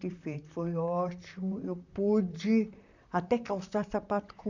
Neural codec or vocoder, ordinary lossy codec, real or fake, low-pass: vocoder, 44.1 kHz, 128 mel bands, Pupu-Vocoder; none; fake; 7.2 kHz